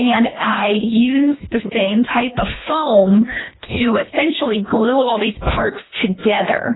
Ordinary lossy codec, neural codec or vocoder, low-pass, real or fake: AAC, 16 kbps; codec, 24 kHz, 1.5 kbps, HILCodec; 7.2 kHz; fake